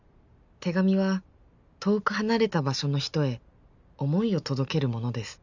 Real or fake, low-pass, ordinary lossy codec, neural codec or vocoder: real; 7.2 kHz; none; none